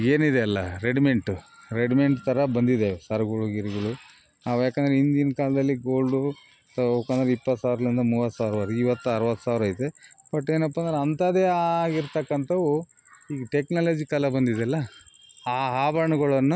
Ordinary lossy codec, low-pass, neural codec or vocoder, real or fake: none; none; none; real